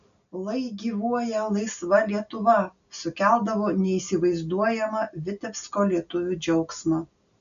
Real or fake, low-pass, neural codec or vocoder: real; 7.2 kHz; none